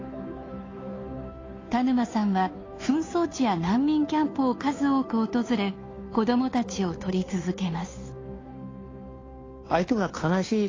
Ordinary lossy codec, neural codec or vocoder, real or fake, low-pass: AAC, 32 kbps; codec, 16 kHz, 2 kbps, FunCodec, trained on Chinese and English, 25 frames a second; fake; 7.2 kHz